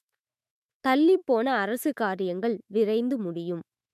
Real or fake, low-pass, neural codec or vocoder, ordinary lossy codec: fake; 14.4 kHz; autoencoder, 48 kHz, 128 numbers a frame, DAC-VAE, trained on Japanese speech; none